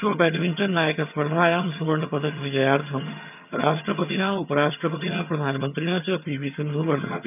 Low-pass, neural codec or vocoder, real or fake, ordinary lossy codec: 3.6 kHz; vocoder, 22.05 kHz, 80 mel bands, HiFi-GAN; fake; none